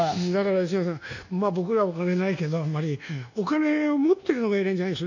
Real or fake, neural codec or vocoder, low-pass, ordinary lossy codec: fake; codec, 24 kHz, 1.2 kbps, DualCodec; 7.2 kHz; none